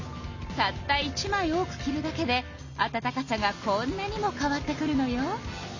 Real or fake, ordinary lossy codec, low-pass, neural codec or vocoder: real; none; 7.2 kHz; none